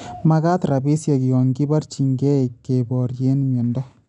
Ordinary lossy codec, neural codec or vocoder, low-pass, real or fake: none; none; 10.8 kHz; real